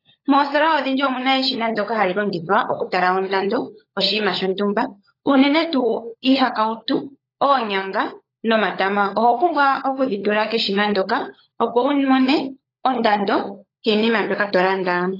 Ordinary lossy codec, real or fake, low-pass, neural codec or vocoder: AAC, 24 kbps; fake; 5.4 kHz; codec, 16 kHz, 16 kbps, FunCodec, trained on LibriTTS, 50 frames a second